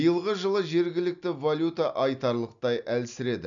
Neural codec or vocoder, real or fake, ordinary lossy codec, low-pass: none; real; none; 7.2 kHz